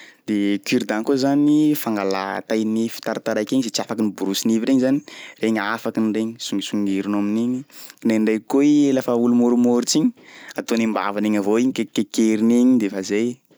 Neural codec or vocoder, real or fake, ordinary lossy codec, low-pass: none; real; none; none